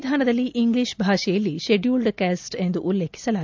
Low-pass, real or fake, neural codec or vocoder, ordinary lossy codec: 7.2 kHz; real; none; MP3, 64 kbps